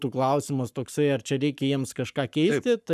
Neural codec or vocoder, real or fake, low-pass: codec, 44.1 kHz, 7.8 kbps, Pupu-Codec; fake; 14.4 kHz